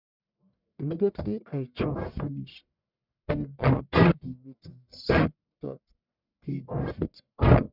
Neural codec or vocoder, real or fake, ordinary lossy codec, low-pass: codec, 44.1 kHz, 1.7 kbps, Pupu-Codec; fake; none; 5.4 kHz